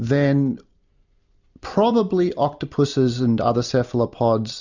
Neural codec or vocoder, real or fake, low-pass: none; real; 7.2 kHz